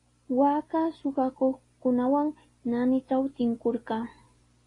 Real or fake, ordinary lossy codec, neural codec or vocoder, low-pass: real; AAC, 32 kbps; none; 10.8 kHz